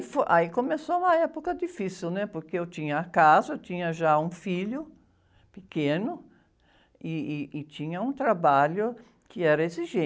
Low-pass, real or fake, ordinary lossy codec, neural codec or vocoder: none; real; none; none